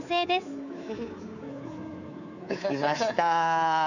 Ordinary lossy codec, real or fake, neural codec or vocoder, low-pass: none; fake; codec, 24 kHz, 3.1 kbps, DualCodec; 7.2 kHz